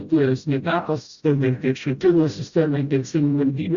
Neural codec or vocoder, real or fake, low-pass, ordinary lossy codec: codec, 16 kHz, 0.5 kbps, FreqCodec, smaller model; fake; 7.2 kHz; Opus, 64 kbps